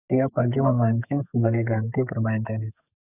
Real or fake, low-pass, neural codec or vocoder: fake; 3.6 kHz; codec, 44.1 kHz, 2.6 kbps, SNAC